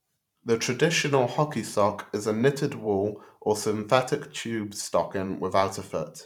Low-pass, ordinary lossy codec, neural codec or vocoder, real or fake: 19.8 kHz; none; vocoder, 44.1 kHz, 128 mel bands every 512 samples, BigVGAN v2; fake